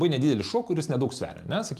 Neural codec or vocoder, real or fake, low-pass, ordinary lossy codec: none; real; 14.4 kHz; Opus, 32 kbps